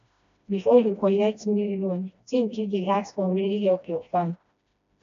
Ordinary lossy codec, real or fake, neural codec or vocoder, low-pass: AAC, 64 kbps; fake; codec, 16 kHz, 1 kbps, FreqCodec, smaller model; 7.2 kHz